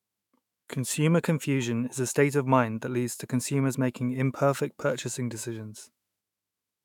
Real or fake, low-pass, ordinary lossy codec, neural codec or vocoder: fake; 19.8 kHz; none; autoencoder, 48 kHz, 128 numbers a frame, DAC-VAE, trained on Japanese speech